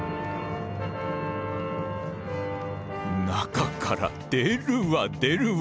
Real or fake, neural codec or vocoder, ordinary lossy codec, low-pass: real; none; none; none